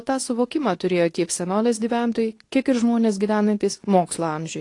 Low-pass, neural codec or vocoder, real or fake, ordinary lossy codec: 10.8 kHz; codec, 24 kHz, 0.9 kbps, WavTokenizer, medium speech release version 2; fake; AAC, 48 kbps